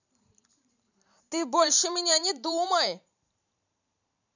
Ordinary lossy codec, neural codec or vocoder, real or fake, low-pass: none; vocoder, 44.1 kHz, 128 mel bands every 512 samples, BigVGAN v2; fake; 7.2 kHz